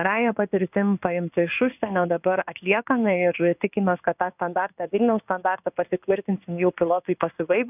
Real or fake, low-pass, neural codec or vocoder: fake; 3.6 kHz; codec, 16 kHz in and 24 kHz out, 1 kbps, XY-Tokenizer